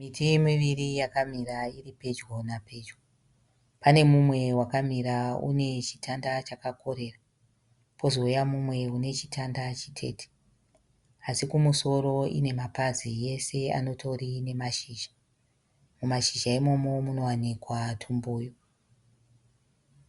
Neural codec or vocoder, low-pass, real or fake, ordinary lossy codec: none; 10.8 kHz; real; Opus, 64 kbps